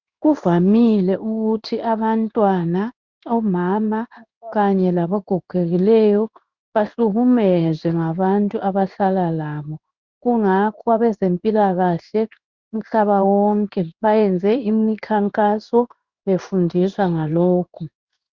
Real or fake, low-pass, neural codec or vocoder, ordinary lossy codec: fake; 7.2 kHz; codec, 16 kHz in and 24 kHz out, 1 kbps, XY-Tokenizer; Opus, 64 kbps